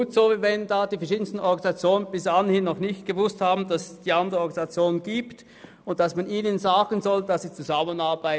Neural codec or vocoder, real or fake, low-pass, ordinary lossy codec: none; real; none; none